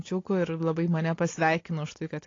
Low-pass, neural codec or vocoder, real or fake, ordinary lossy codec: 7.2 kHz; none; real; AAC, 32 kbps